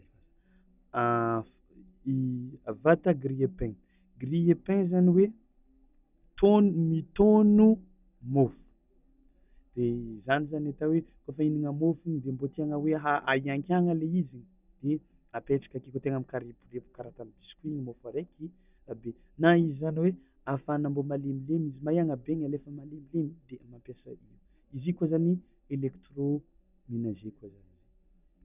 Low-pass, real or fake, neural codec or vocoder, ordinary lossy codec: 3.6 kHz; real; none; none